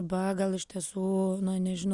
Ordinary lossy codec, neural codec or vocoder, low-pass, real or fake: Opus, 64 kbps; none; 10.8 kHz; real